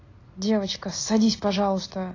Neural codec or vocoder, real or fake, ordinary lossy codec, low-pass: none; real; AAC, 32 kbps; 7.2 kHz